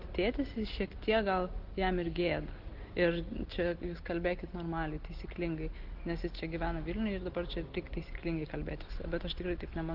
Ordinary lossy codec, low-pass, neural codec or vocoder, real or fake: Opus, 16 kbps; 5.4 kHz; none; real